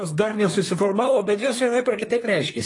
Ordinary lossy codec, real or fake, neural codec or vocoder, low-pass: AAC, 32 kbps; fake; codec, 24 kHz, 1 kbps, SNAC; 10.8 kHz